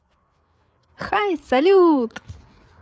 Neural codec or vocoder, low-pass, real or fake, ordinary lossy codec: codec, 16 kHz, 8 kbps, FreqCodec, larger model; none; fake; none